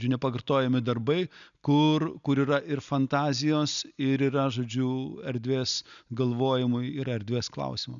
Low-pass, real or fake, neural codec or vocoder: 7.2 kHz; real; none